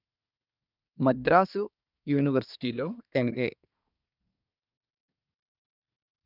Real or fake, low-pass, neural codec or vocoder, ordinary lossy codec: fake; 5.4 kHz; codec, 24 kHz, 1 kbps, SNAC; none